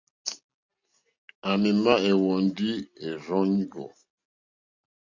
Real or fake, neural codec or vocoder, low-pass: real; none; 7.2 kHz